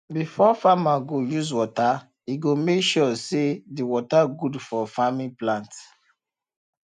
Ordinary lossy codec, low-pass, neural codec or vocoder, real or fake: none; 9.9 kHz; none; real